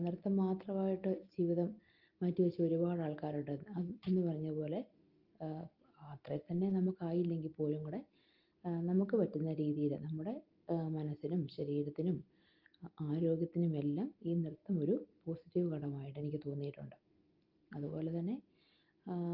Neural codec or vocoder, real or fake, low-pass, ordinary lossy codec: none; real; 5.4 kHz; Opus, 32 kbps